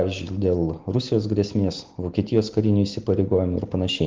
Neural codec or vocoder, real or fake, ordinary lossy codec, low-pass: none; real; Opus, 16 kbps; 7.2 kHz